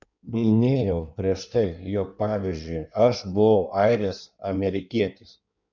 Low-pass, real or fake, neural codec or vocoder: 7.2 kHz; fake; codec, 16 kHz in and 24 kHz out, 1.1 kbps, FireRedTTS-2 codec